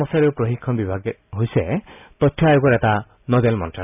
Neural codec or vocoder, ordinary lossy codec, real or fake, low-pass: none; none; real; 3.6 kHz